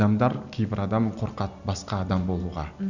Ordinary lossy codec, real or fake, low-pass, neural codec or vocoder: none; real; 7.2 kHz; none